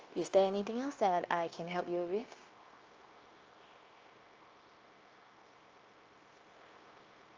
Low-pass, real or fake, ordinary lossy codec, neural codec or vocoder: 7.2 kHz; fake; Opus, 24 kbps; codec, 16 kHz, 0.9 kbps, LongCat-Audio-Codec